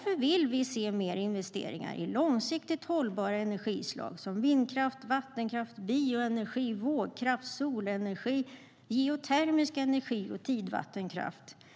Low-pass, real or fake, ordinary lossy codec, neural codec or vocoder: none; real; none; none